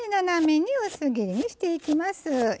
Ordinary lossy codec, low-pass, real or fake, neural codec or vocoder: none; none; real; none